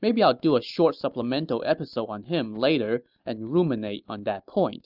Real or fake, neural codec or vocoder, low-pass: real; none; 5.4 kHz